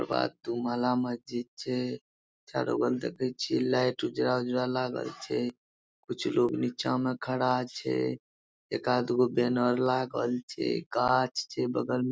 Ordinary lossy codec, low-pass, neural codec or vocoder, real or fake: none; none; none; real